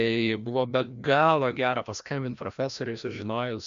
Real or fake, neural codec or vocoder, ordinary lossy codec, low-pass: fake; codec, 16 kHz, 1 kbps, FreqCodec, larger model; MP3, 64 kbps; 7.2 kHz